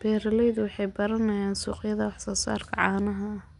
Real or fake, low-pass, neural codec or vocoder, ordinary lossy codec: real; 10.8 kHz; none; none